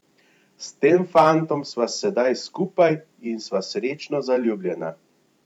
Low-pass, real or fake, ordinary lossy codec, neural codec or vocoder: 19.8 kHz; fake; none; vocoder, 44.1 kHz, 128 mel bands every 512 samples, BigVGAN v2